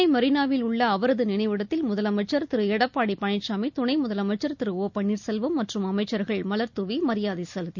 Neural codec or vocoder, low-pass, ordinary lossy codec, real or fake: none; 7.2 kHz; none; real